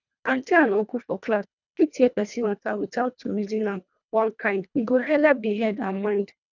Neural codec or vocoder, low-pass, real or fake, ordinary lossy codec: codec, 24 kHz, 1.5 kbps, HILCodec; 7.2 kHz; fake; none